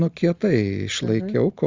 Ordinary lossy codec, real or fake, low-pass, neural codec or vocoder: Opus, 32 kbps; real; 7.2 kHz; none